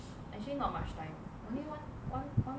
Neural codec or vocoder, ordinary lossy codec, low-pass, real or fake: none; none; none; real